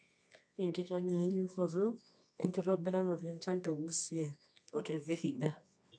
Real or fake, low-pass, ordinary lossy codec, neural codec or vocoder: fake; 9.9 kHz; none; codec, 24 kHz, 0.9 kbps, WavTokenizer, medium music audio release